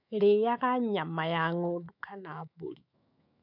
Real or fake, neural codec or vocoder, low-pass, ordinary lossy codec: fake; autoencoder, 48 kHz, 128 numbers a frame, DAC-VAE, trained on Japanese speech; 5.4 kHz; none